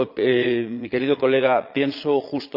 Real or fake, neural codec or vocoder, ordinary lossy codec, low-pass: fake; vocoder, 22.05 kHz, 80 mel bands, Vocos; none; 5.4 kHz